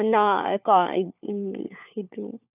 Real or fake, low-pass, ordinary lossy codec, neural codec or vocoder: fake; 3.6 kHz; none; codec, 16 kHz, 4 kbps, FunCodec, trained on LibriTTS, 50 frames a second